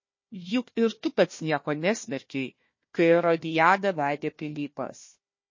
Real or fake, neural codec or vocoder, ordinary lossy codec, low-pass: fake; codec, 16 kHz, 1 kbps, FunCodec, trained on Chinese and English, 50 frames a second; MP3, 32 kbps; 7.2 kHz